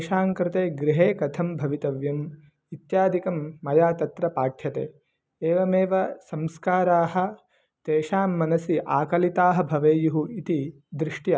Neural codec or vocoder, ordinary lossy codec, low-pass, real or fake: none; none; none; real